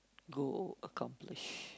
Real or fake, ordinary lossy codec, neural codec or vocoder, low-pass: real; none; none; none